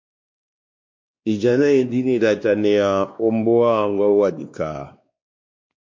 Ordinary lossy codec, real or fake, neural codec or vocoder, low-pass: MP3, 48 kbps; fake; codec, 16 kHz, 2 kbps, X-Codec, WavLM features, trained on Multilingual LibriSpeech; 7.2 kHz